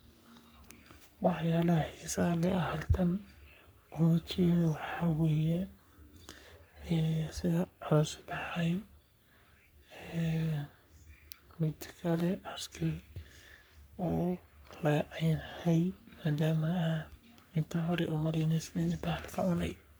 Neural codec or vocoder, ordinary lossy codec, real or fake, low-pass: codec, 44.1 kHz, 3.4 kbps, Pupu-Codec; none; fake; none